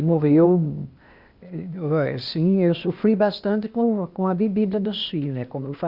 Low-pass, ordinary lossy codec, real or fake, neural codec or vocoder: 5.4 kHz; Opus, 64 kbps; fake; codec, 16 kHz, 0.8 kbps, ZipCodec